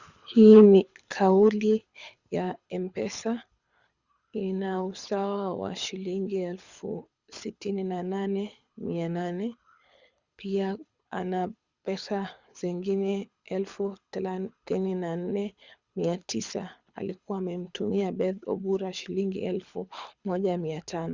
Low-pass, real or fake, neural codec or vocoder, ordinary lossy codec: 7.2 kHz; fake; codec, 24 kHz, 6 kbps, HILCodec; Opus, 64 kbps